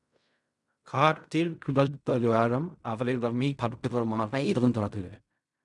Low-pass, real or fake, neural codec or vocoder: 10.8 kHz; fake; codec, 16 kHz in and 24 kHz out, 0.4 kbps, LongCat-Audio-Codec, fine tuned four codebook decoder